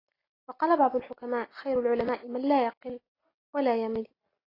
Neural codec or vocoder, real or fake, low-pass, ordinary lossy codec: none; real; 5.4 kHz; AAC, 24 kbps